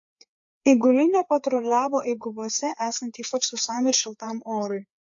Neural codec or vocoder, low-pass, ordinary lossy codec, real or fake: codec, 16 kHz, 4 kbps, FreqCodec, larger model; 7.2 kHz; AAC, 64 kbps; fake